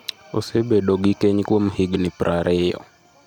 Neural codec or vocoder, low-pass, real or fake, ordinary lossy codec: vocoder, 44.1 kHz, 128 mel bands every 256 samples, BigVGAN v2; 19.8 kHz; fake; none